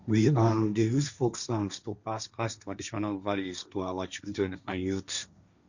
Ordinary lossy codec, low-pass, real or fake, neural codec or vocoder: none; 7.2 kHz; fake; codec, 16 kHz, 1.1 kbps, Voila-Tokenizer